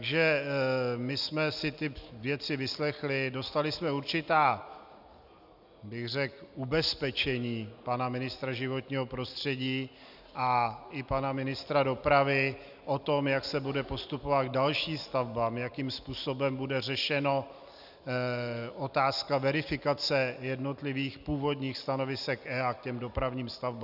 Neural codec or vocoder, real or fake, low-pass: none; real; 5.4 kHz